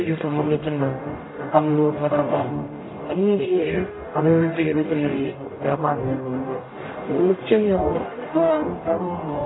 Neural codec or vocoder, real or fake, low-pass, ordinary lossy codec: codec, 44.1 kHz, 0.9 kbps, DAC; fake; 7.2 kHz; AAC, 16 kbps